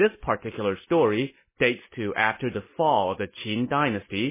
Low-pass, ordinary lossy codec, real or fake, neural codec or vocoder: 3.6 kHz; MP3, 16 kbps; real; none